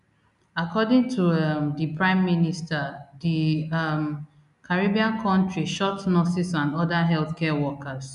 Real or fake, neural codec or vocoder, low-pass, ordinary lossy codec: real; none; 10.8 kHz; none